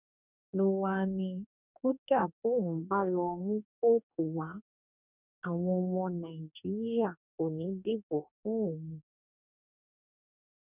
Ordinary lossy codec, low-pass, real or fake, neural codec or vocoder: none; 3.6 kHz; fake; codec, 44.1 kHz, 2.6 kbps, DAC